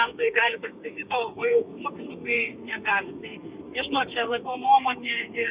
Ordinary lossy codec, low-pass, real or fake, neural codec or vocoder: Opus, 64 kbps; 3.6 kHz; fake; codec, 32 kHz, 1.9 kbps, SNAC